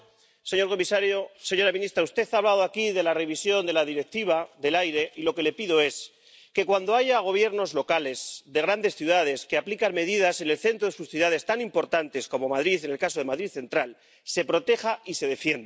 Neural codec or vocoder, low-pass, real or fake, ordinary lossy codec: none; none; real; none